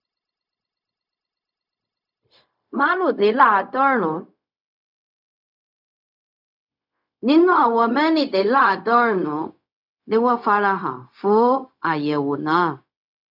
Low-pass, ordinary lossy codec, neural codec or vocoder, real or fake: 5.4 kHz; none; codec, 16 kHz, 0.4 kbps, LongCat-Audio-Codec; fake